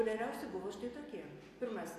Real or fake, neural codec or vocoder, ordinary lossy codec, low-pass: real; none; AAC, 48 kbps; 14.4 kHz